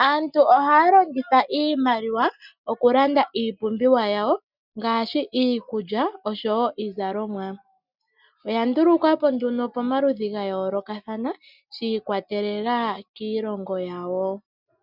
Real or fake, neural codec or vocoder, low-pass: real; none; 5.4 kHz